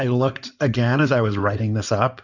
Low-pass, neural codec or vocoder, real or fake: 7.2 kHz; codec, 16 kHz in and 24 kHz out, 2.2 kbps, FireRedTTS-2 codec; fake